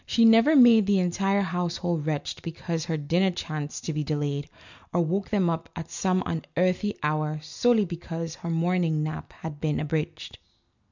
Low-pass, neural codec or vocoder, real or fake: 7.2 kHz; none; real